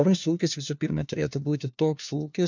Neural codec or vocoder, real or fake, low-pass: codec, 16 kHz, 1 kbps, FunCodec, trained on LibriTTS, 50 frames a second; fake; 7.2 kHz